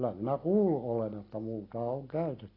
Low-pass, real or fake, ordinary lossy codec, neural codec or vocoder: 5.4 kHz; real; AAC, 24 kbps; none